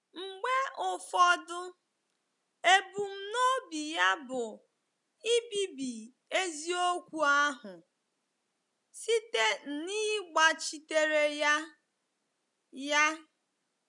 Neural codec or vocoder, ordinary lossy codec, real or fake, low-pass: none; none; real; 10.8 kHz